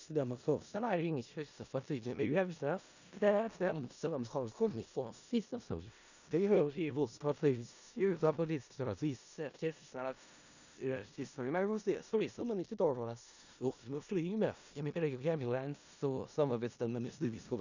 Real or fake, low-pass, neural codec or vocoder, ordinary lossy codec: fake; 7.2 kHz; codec, 16 kHz in and 24 kHz out, 0.4 kbps, LongCat-Audio-Codec, four codebook decoder; none